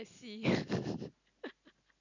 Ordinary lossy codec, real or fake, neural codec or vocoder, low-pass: none; real; none; 7.2 kHz